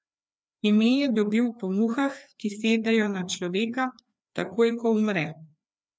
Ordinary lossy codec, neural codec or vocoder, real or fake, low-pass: none; codec, 16 kHz, 2 kbps, FreqCodec, larger model; fake; none